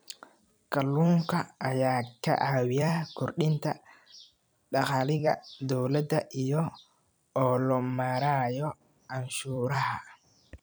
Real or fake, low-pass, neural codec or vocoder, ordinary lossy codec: fake; none; vocoder, 44.1 kHz, 128 mel bands every 256 samples, BigVGAN v2; none